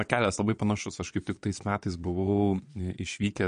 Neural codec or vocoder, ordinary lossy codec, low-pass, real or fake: vocoder, 22.05 kHz, 80 mel bands, Vocos; MP3, 48 kbps; 9.9 kHz; fake